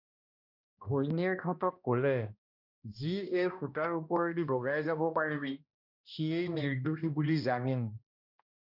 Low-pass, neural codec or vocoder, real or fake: 5.4 kHz; codec, 16 kHz, 1 kbps, X-Codec, HuBERT features, trained on balanced general audio; fake